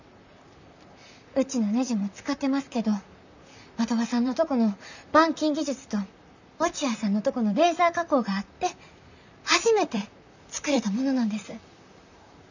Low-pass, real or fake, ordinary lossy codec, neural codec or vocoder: 7.2 kHz; fake; none; vocoder, 44.1 kHz, 128 mel bands, Pupu-Vocoder